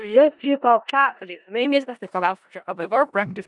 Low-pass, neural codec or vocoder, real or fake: 10.8 kHz; codec, 16 kHz in and 24 kHz out, 0.4 kbps, LongCat-Audio-Codec, four codebook decoder; fake